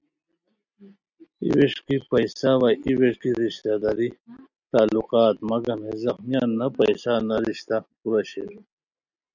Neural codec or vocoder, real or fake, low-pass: none; real; 7.2 kHz